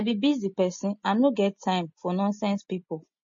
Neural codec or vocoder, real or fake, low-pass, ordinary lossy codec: none; real; 7.2 kHz; MP3, 32 kbps